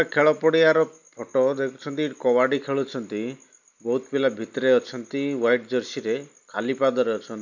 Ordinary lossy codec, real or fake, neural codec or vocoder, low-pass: none; real; none; 7.2 kHz